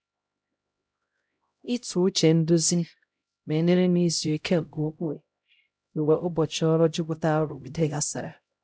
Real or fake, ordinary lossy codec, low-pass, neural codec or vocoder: fake; none; none; codec, 16 kHz, 0.5 kbps, X-Codec, HuBERT features, trained on LibriSpeech